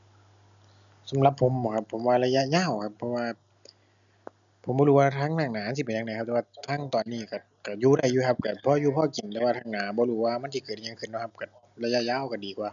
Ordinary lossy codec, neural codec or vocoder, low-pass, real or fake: MP3, 96 kbps; none; 7.2 kHz; real